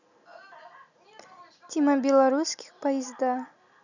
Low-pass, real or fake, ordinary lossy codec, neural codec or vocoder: 7.2 kHz; real; none; none